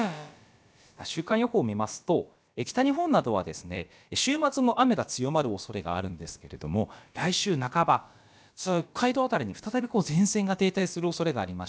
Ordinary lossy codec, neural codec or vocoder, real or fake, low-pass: none; codec, 16 kHz, about 1 kbps, DyCAST, with the encoder's durations; fake; none